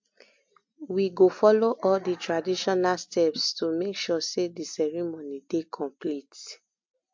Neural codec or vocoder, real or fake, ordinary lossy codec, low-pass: none; real; MP3, 48 kbps; 7.2 kHz